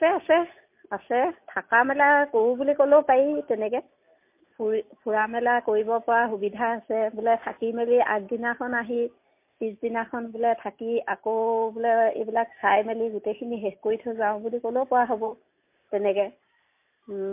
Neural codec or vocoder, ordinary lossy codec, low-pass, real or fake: none; MP3, 24 kbps; 3.6 kHz; real